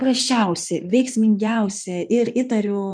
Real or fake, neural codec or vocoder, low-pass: fake; codec, 16 kHz in and 24 kHz out, 2.2 kbps, FireRedTTS-2 codec; 9.9 kHz